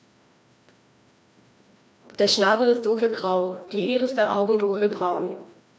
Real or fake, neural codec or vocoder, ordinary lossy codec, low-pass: fake; codec, 16 kHz, 1 kbps, FreqCodec, larger model; none; none